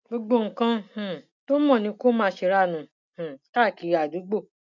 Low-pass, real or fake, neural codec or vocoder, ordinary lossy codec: 7.2 kHz; real; none; none